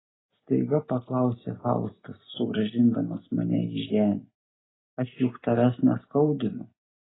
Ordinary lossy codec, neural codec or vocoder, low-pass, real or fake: AAC, 16 kbps; none; 7.2 kHz; real